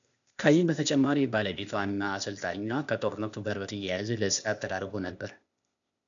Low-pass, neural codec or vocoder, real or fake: 7.2 kHz; codec, 16 kHz, 0.8 kbps, ZipCodec; fake